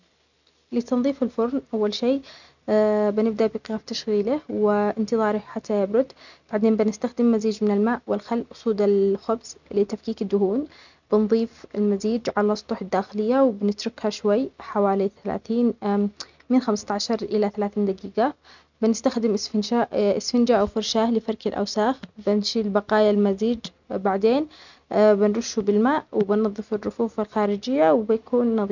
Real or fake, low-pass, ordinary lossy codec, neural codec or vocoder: real; 7.2 kHz; none; none